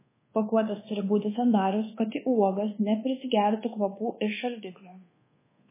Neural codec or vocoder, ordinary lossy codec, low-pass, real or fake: codec, 24 kHz, 1.2 kbps, DualCodec; MP3, 16 kbps; 3.6 kHz; fake